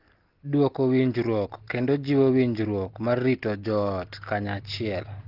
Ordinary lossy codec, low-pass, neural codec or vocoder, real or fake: Opus, 16 kbps; 5.4 kHz; none; real